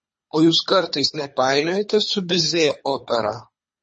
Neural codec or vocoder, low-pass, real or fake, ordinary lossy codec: codec, 24 kHz, 3 kbps, HILCodec; 10.8 kHz; fake; MP3, 32 kbps